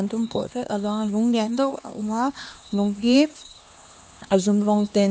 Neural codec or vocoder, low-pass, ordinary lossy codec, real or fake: codec, 16 kHz, 2 kbps, X-Codec, HuBERT features, trained on LibriSpeech; none; none; fake